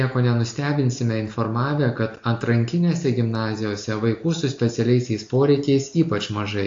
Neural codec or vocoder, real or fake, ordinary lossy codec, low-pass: none; real; AAC, 48 kbps; 7.2 kHz